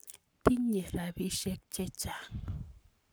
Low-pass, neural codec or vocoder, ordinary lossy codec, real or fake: none; codec, 44.1 kHz, 7.8 kbps, Pupu-Codec; none; fake